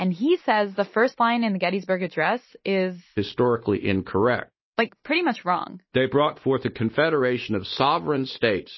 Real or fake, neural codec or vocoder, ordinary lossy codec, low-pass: real; none; MP3, 24 kbps; 7.2 kHz